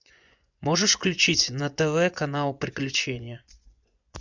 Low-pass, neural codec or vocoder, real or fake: 7.2 kHz; vocoder, 24 kHz, 100 mel bands, Vocos; fake